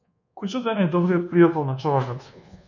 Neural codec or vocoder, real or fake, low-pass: codec, 24 kHz, 1.2 kbps, DualCodec; fake; 7.2 kHz